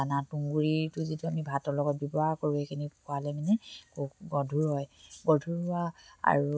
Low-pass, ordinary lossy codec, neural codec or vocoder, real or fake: none; none; none; real